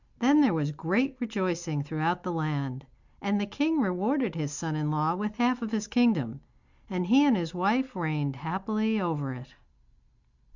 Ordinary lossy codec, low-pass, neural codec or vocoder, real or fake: Opus, 64 kbps; 7.2 kHz; none; real